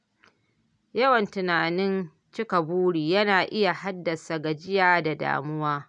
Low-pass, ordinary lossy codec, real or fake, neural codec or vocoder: 9.9 kHz; none; real; none